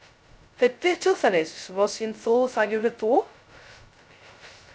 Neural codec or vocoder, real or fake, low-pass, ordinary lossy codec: codec, 16 kHz, 0.2 kbps, FocalCodec; fake; none; none